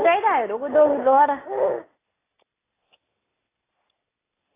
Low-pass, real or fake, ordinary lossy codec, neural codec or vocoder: 3.6 kHz; real; AAC, 16 kbps; none